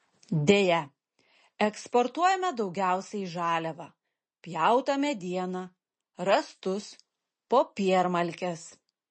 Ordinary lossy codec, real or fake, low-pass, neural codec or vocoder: MP3, 32 kbps; real; 9.9 kHz; none